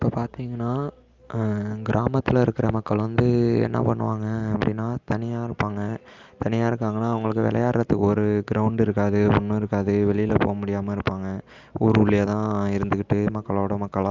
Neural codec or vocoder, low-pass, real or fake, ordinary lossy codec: none; 7.2 kHz; real; Opus, 32 kbps